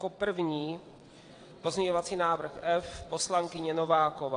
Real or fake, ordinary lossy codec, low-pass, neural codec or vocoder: fake; AAC, 48 kbps; 9.9 kHz; vocoder, 22.05 kHz, 80 mel bands, WaveNeXt